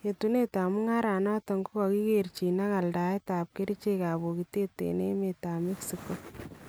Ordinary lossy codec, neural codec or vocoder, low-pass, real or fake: none; none; none; real